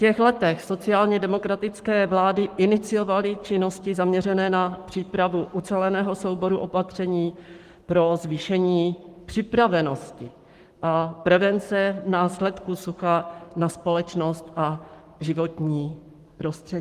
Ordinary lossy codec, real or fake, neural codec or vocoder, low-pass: Opus, 24 kbps; fake; codec, 44.1 kHz, 7.8 kbps, Pupu-Codec; 14.4 kHz